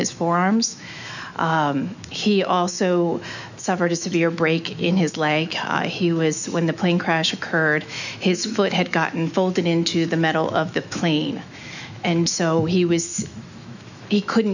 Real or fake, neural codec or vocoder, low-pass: fake; autoencoder, 48 kHz, 128 numbers a frame, DAC-VAE, trained on Japanese speech; 7.2 kHz